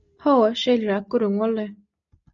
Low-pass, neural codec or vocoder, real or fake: 7.2 kHz; none; real